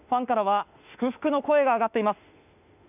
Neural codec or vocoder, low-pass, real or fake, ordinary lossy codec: autoencoder, 48 kHz, 32 numbers a frame, DAC-VAE, trained on Japanese speech; 3.6 kHz; fake; none